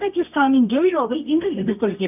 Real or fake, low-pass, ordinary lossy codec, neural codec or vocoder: fake; 3.6 kHz; none; codec, 24 kHz, 0.9 kbps, WavTokenizer, medium music audio release